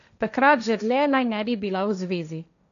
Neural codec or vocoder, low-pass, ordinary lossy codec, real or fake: codec, 16 kHz, 1.1 kbps, Voila-Tokenizer; 7.2 kHz; none; fake